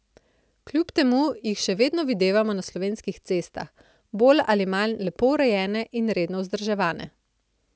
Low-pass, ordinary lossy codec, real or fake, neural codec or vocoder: none; none; real; none